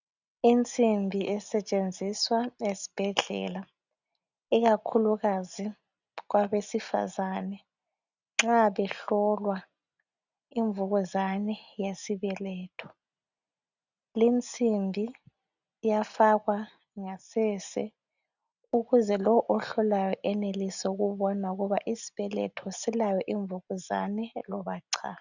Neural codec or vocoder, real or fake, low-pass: none; real; 7.2 kHz